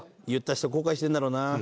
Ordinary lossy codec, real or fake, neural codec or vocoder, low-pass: none; real; none; none